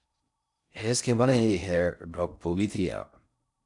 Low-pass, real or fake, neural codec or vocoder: 10.8 kHz; fake; codec, 16 kHz in and 24 kHz out, 0.6 kbps, FocalCodec, streaming, 4096 codes